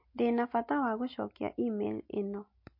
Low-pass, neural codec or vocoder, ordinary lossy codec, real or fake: 5.4 kHz; none; MP3, 32 kbps; real